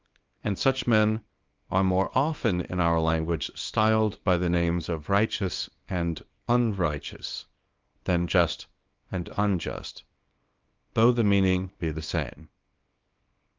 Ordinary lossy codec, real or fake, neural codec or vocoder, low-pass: Opus, 24 kbps; fake; codec, 24 kHz, 0.9 kbps, WavTokenizer, small release; 7.2 kHz